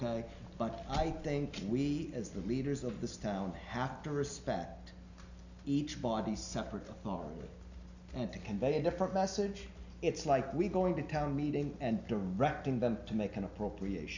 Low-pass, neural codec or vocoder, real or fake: 7.2 kHz; none; real